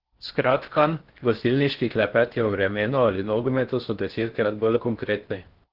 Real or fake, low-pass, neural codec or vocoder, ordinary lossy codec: fake; 5.4 kHz; codec, 16 kHz in and 24 kHz out, 0.6 kbps, FocalCodec, streaming, 4096 codes; Opus, 16 kbps